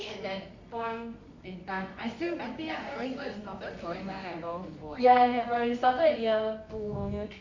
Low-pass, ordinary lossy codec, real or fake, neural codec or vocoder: 7.2 kHz; MP3, 64 kbps; fake; codec, 24 kHz, 0.9 kbps, WavTokenizer, medium music audio release